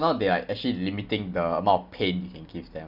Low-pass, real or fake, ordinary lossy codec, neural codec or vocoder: 5.4 kHz; real; none; none